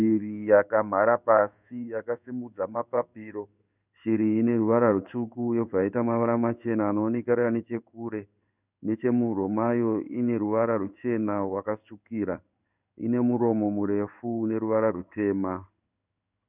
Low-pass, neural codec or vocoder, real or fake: 3.6 kHz; codec, 16 kHz in and 24 kHz out, 1 kbps, XY-Tokenizer; fake